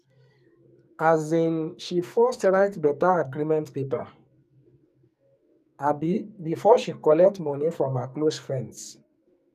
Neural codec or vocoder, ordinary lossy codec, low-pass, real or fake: codec, 44.1 kHz, 2.6 kbps, SNAC; none; 14.4 kHz; fake